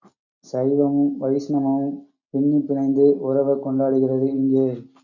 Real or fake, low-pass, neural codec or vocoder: real; 7.2 kHz; none